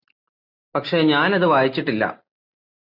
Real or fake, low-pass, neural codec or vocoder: real; 5.4 kHz; none